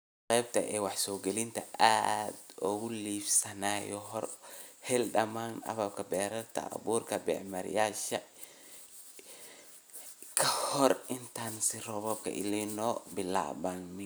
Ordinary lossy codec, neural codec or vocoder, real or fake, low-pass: none; none; real; none